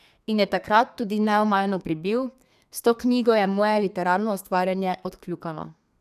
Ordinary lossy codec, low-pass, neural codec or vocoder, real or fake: none; 14.4 kHz; codec, 32 kHz, 1.9 kbps, SNAC; fake